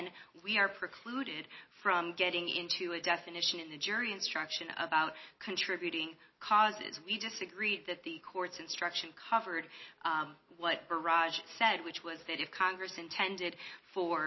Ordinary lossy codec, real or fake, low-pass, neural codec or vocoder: MP3, 24 kbps; real; 7.2 kHz; none